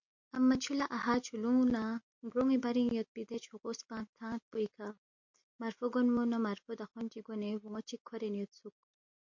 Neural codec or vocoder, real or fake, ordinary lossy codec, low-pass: none; real; MP3, 48 kbps; 7.2 kHz